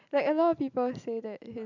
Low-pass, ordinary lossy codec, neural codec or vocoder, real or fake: 7.2 kHz; none; none; real